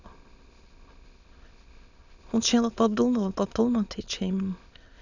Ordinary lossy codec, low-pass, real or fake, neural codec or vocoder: none; 7.2 kHz; fake; autoencoder, 22.05 kHz, a latent of 192 numbers a frame, VITS, trained on many speakers